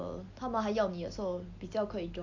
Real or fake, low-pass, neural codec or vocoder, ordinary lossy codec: real; 7.2 kHz; none; AAC, 48 kbps